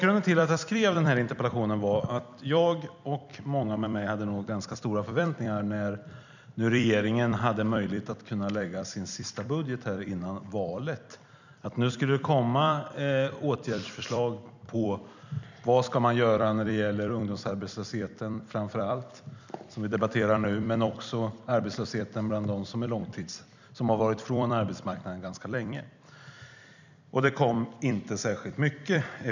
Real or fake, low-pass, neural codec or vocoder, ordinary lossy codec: fake; 7.2 kHz; vocoder, 44.1 kHz, 128 mel bands every 256 samples, BigVGAN v2; none